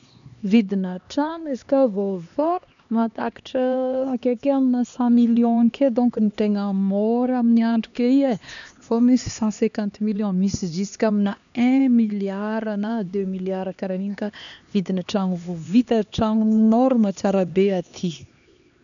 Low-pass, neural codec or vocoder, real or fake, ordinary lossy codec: 7.2 kHz; codec, 16 kHz, 2 kbps, X-Codec, HuBERT features, trained on LibriSpeech; fake; none